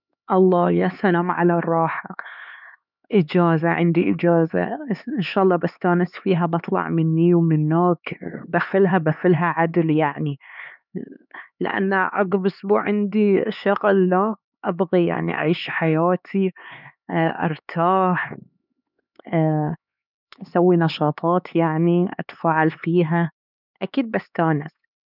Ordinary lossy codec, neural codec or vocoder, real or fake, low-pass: none; codec, 16 kHz, 4 kbps, X-Codec, HuBERT features, trained on LibriSpeech; fake; 5.4 kHz